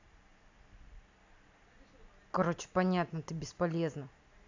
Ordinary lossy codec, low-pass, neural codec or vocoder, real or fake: AAC, 48 kbps; 7.2 kHz; none; real